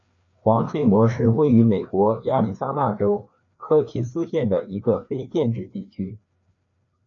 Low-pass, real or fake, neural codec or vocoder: 7.2 kHz; fake; codec, 16 kHz, 2 kbps, FreqCodec, larger model